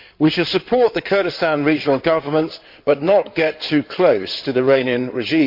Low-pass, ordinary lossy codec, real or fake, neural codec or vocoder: 5.4 kHz; MP3, 48 kbps; fake; codec, 16 kHz in and 24 kHz out, 2.2 kbps, FireRedTTS-2 codec